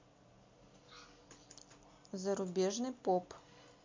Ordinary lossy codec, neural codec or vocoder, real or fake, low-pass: MP3, 48 kbps; none; real; 7.2 kHz